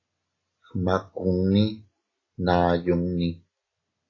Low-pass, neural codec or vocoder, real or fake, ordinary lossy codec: 7.2 kHz; none; real; AAC, 32 kbps